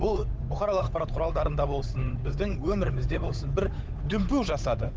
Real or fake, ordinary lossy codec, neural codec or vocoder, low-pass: fake; none; codec, 16 kHz, 8 kbps, FunCodec, trained on Chinese and English, 25 frames a second; none